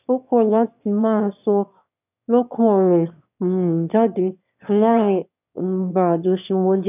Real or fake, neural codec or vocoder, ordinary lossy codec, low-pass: fake; autoencoder, 22.05 kHz, a latent of 192 numbers a frame, VITS, trained on one speaker; none; 3.6 kHz